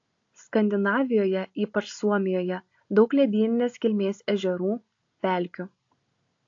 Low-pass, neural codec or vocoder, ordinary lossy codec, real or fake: 7.2 kHz; none; AAC, 48 kbps; real